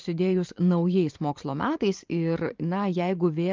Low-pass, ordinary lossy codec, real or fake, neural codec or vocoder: 7.2 kHz; Opus, 24 kbps; fake; vocoder, 44.1 kHz, 128 mel bands every 512 samples, BigVGAN v2